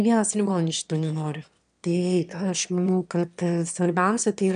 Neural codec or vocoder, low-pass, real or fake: autoencoder, 22.05 kHz, a latent of 192 numbers a frame, VITS, trained on one speaker; 9.9 kHz; fake